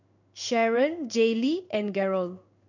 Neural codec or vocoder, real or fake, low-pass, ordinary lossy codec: codec, 16 kHz in and 24 kHz out, 1 kbps, XY-Tokenizer; fake; 7.2 kHz; none